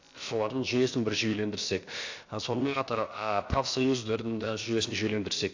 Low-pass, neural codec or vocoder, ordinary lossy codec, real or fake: 7.2 kHz; codec, 16 kHz, about 1 kbps, DyCAST, with the encoder's durations; AAC, 48 kbps; fake